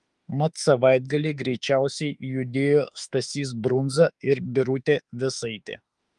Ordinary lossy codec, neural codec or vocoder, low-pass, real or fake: Opus, 32 kbps; autoencoder, 48 kHz, 32 numbers a frame, DAC-VAE, trained on Japanese speech; 10.8 kHz; fake